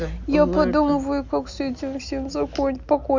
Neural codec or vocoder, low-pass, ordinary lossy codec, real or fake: none; 7.2 kHz; none; real